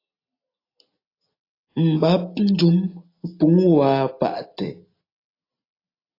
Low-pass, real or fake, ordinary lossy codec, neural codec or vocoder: 5.4 kHz; real; AAC, 32 kbps; none